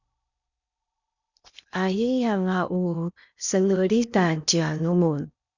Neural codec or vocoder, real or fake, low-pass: codec, 16 kHz in and 24 kHz out, 0.8 kbps, FocalCodec, streaming, 65536 codes; fake; 7.2 kHz